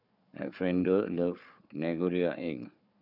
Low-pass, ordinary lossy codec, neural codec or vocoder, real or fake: 5.4 kHz; none; codec, 16 kHz, 4 kbps, FunCodec, trained on Chinese and English, 50 frames a second; fake